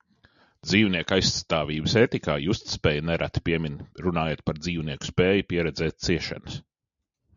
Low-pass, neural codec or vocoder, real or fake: 7.2 kHz; none; real